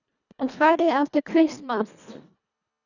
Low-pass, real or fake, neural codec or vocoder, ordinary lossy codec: 7.2 kHz; fake; codec, 24 kHz, 1.5 kbps, HILCodec; none